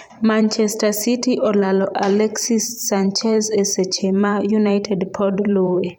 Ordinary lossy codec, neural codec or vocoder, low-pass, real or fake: none; vocoder, 44.1 kHz, 128 mel bands every 512 samples, BigVGAN v2; none; fake